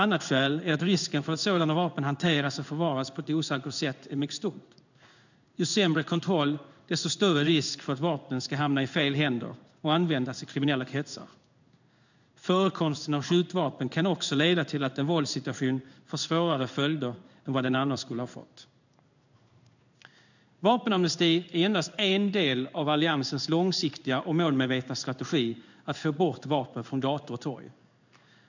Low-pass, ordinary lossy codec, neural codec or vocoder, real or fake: 7.2 kHz; none; codec, 16 kHz in and 24 kHz out, 1 kbps, XY-Tokenizer; fake